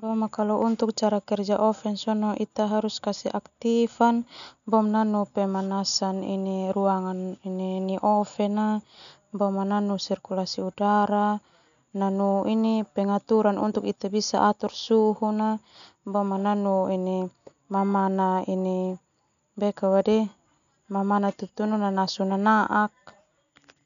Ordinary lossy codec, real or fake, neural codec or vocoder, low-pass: none; real; none; 7.2 kHz